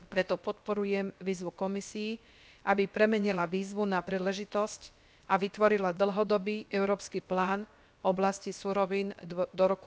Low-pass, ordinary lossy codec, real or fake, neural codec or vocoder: none; none; fake; codec, 16 kHz, about 1 kbps, DyCAST, with the encoder's durations